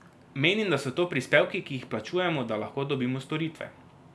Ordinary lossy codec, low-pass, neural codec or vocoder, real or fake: none; none; none; real